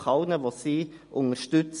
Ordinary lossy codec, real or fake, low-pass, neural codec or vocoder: MP3, 48 kbps; real; 10.8 kHz; none